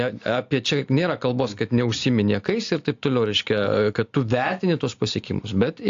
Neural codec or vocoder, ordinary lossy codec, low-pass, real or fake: none; AAC, 48 kbps; 7.2 kHz; real